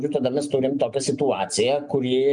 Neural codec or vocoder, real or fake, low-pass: none; real; 9.9 kHz